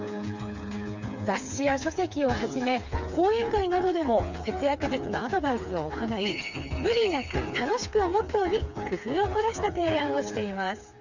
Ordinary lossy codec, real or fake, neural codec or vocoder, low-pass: none; fake; codec, 16 kHz, 4 kbps, FreqCodec, smaller model; 7.2 kHz